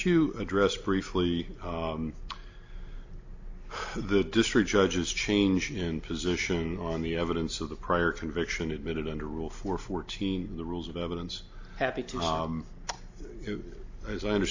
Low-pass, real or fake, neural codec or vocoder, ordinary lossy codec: 7.2 kHz; real; none; AAC, 48 kbps